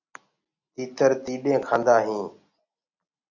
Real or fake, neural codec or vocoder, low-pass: real; none; 7.2 kHz